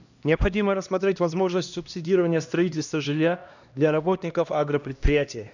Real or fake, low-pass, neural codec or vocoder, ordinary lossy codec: fake; 7.2 kHz; codec, 16 kHz, 1 kbps, X-Codec, HuBERT features, trained on LibriSpeech; none